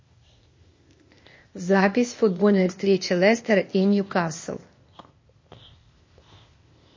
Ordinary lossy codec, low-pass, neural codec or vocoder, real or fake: MP3, 32 kbps; 7.2 kHz; codec, 16 kHz, 0.8 kbps, ZipCodec; fake